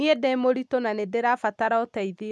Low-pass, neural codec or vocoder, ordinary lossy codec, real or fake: none; none; none; real